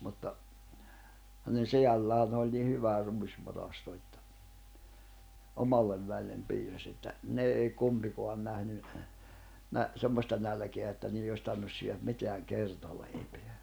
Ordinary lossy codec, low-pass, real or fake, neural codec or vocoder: none; none; real; none